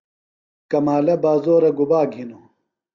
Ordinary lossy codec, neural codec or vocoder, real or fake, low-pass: Opus, 64 kbps; none; real; 7.2 kHz